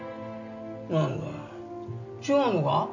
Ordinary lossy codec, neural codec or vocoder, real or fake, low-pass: none; none; real; 7.2 kHz